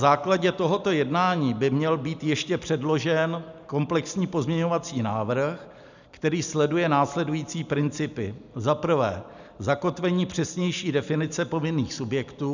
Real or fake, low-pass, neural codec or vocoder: real; 7.2 kHz; none